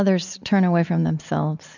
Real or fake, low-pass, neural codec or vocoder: real; 7.2 kHz; none